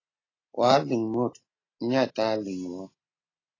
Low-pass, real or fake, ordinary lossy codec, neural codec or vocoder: 7.2 kHz; real; AAC, 32 kbps; none